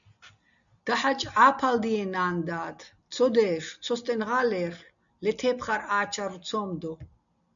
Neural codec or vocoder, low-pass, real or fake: none; 7.2 kHz; real